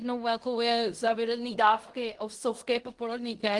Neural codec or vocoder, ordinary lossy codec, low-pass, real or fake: codec, 16 kHz in and 24 kHz out, 0.4 kbps, LongCat-Audio-Codec, fine tuned four codebook decoder; Opus, 24 kbps; 10.8 kHz; fake